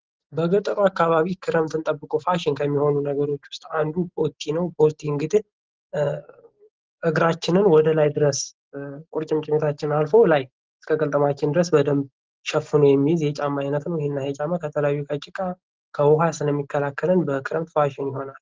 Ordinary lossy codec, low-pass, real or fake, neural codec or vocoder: Opus, 16 kbps; 7.2 kHz; real; none